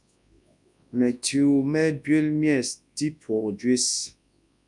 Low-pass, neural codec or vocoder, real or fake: 10.8 kHz; codec, 24 kHz, 0.9 kbps, WavTokenizer, large speech release; fake